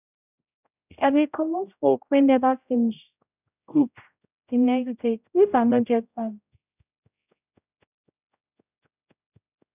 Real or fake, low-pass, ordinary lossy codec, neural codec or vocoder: fake; 3.6 kHz; none; codec, 16 kHz, 0.5 kbps, X-Codec, HuBERT features, trained on general audio